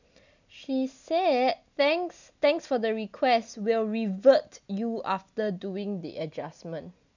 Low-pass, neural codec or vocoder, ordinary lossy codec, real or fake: 7.2 kHz; none; none; real